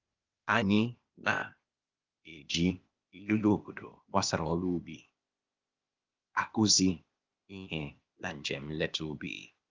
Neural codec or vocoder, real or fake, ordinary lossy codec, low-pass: codec, 16 kHz, 0.8 kbps, ZipCodec; fake; Opus, 32 kbps; 7.2 kHz